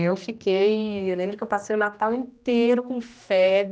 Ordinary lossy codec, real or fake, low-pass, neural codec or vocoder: none; fake; none; codec, 16 kHz, 1 kbps, X-Codec, HuBERT features, trained on general audio